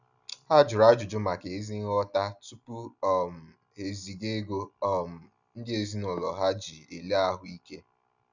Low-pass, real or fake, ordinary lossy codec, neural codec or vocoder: 7.2 kHz; real; none; none